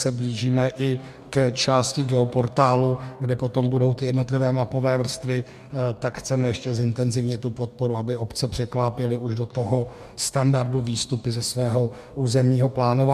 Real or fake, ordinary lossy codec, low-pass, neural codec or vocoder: fake; MP3, 96 kbps; 14.4 kHz; codec, 44.1 kHz, 2.6 kbps, DAC